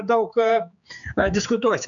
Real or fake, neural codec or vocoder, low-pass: fake; codec, 16 kHz, 4 kbps, X-Codec, HuBERT features, trained on general audio; 7.2 kHz